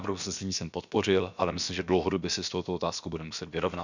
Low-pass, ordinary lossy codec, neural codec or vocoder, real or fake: 7.2 kHz; none; codec, 16 kHz, about 1 kbps, DyCAST, with the encoder's durations; fake